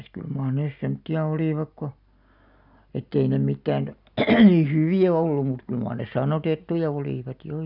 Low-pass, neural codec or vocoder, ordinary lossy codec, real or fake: 5.4 kHz; none; none; real